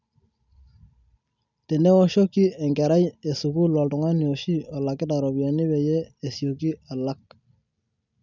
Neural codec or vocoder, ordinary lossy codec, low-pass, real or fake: none; none; 7.2 kHz; real